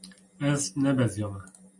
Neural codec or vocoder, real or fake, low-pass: none; real; 10.8 kHz